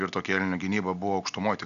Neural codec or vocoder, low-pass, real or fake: none; 7.2 kHz; real